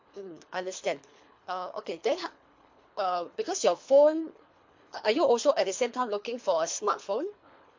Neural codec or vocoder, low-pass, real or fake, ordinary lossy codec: codec, 24 kHz, 3 kbps, HILCodec; 7.2 kHz; fake; MP3, 48 kbps